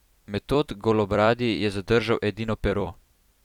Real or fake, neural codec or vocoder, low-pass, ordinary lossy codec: fake; vocoder, 44.1 kHz, 128 mel bands every 256 samples, BigVGAN v2; 19.8 kHz; none